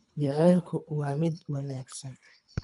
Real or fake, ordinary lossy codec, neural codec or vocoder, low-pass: fake; none; codec, 24 kHz, 3 kbps, HILCodec; 10.8 kHz